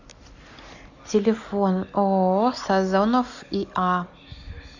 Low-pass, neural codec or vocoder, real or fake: 7.2 kHz; none; real